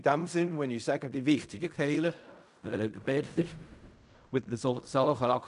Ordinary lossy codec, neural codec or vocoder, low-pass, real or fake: none; codec, 16 kHz in and 24 kHz out, 0.4 kbps, LongCat-Audio-Codec, fine tuned four codebook decoder; 10.8 kHz; fake